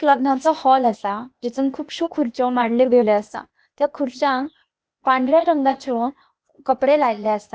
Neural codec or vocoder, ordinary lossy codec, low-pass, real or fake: codec, 16 kHz, 0.8 kbps, ZipCodec; none; none; fake